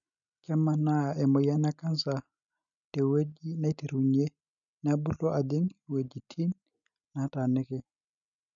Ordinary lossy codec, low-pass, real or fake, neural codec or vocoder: none; 7.2 kHz; real; none